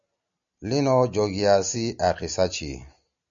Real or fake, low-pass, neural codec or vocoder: real; 7.2 kHz; none